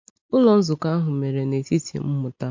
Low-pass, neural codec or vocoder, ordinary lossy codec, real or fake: 7.2 kHz; none; MP3, 48 kbps; real